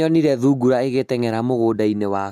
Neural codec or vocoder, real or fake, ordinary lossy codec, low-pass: none; real; none; 14.4 kHz